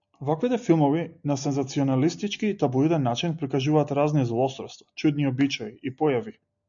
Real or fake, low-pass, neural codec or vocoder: real; 7.2 kHz; none